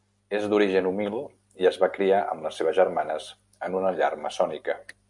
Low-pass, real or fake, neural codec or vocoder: 10.8 kHz; real; none